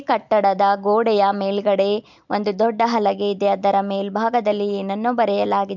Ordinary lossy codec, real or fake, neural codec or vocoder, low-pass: MP3, 64 kbps; real; none; 7.2 kHz